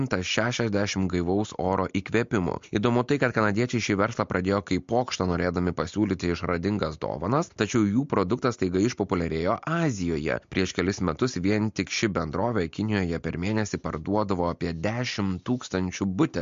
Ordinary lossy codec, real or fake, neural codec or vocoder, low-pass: MP3, 48 kbps; real; none; 7.2 kHz